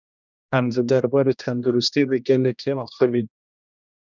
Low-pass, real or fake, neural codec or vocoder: 7.2 kHz; fake; codec, 16 kHz, 1 kbps, X-Codec, HuBERT features, trained on general audio